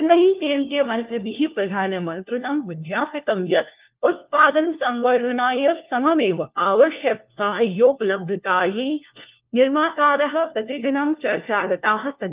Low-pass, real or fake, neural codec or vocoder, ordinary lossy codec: 3.6 kHz; fake; codec, 16 kHz, 1 kbps, FunCodec, trained on LibriTTS, 50 frames a second; Opus, 32 kbps